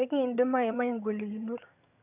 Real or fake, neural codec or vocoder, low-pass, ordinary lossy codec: fake; vocoder, 22.05 kHz, 80 mel bands, HiFi-GAN; 3.6 kHz; none